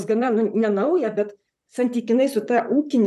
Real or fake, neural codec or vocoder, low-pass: fake; vocoder, 44.1 kHz, 128 mel bands, Pupu-Vocoder; 14.4 kHz